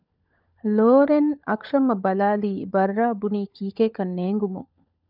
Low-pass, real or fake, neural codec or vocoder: 5.4 kHz; fake; codec, 16 kHz, 16 kbps, FunCodec, trained on LibriTTS, 50 frames a second